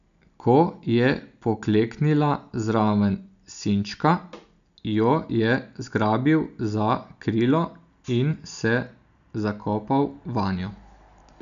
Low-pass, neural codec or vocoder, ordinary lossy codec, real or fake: 7.2 kHz; none; none; real